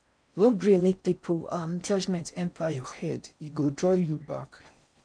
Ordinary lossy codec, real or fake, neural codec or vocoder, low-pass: MP3, 96 kbps; fake; codec, 16 kHz in and 24 kHz out, 0.6 kbps, FocalCodec, streaming, 4096 codes; 9.9 kHz